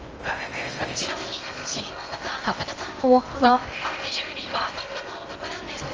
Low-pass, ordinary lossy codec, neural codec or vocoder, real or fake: 7.2 kHz; Opus, 24 kbps; codec, 16 kHz in and 24 kHz out, 0.6 kbps, FocalCodec, streaming, 2048 codes; fake